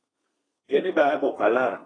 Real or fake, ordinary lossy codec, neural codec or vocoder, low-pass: fake; AAC, 32 kbps; codec, 32 kHz, 1.9 kbps, SNAC; 9.9 kHz